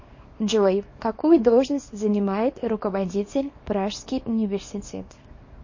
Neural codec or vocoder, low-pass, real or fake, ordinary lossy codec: codec, 24 kHz, 0.9 kbps, WavTokenizer, small release; 7.2 kHz; fake; MP3, 32 kbps